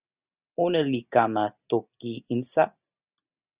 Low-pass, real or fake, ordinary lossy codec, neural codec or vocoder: 3.6 kHz; real; Opus, 64 kbps; none